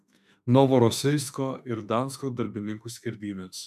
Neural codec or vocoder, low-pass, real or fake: autoencoder, 48 kHz, 32 numbers a frame, DAC-VAE, trained on Japanese speech; 14.4 kHz; fake